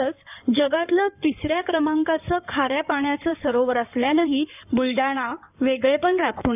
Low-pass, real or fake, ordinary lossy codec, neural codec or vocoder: 3.6 kHz; fake; none; codec, 16 kHz in and 24 kHz out, 2.2 kbps, FireRedTTS-2 codec